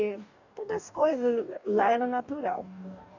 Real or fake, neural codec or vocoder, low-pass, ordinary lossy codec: fake; codec, 44.1 kHz, 2.6 kbps, DAC; 7.2 kHz; none